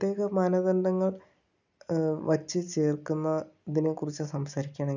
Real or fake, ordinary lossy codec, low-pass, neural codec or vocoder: real; none; 7.2 kHz; none